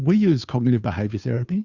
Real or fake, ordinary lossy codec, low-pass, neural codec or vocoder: fake; Opus, 64 kbps; 7.2 kHz; codec, 16 kHz, 2 kbps, FunCodec, trained on Chinese and English, 25 frames a second